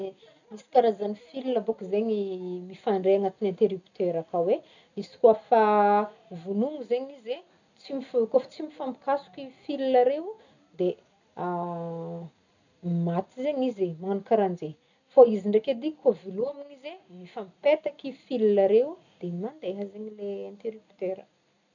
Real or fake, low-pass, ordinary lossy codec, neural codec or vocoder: real; 7.2 kHz; none; none